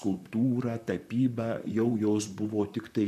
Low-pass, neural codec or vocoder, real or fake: 14.4 kHz; vocoder, 44.1 kHz, 128 mel bands, Pupu-Vocoder; fake